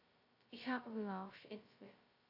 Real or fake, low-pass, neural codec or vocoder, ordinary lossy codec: fake; 5.4 kHz; codec, 16 kHz, 0.2 kbps, FocalCodec; AAC, 48 kbps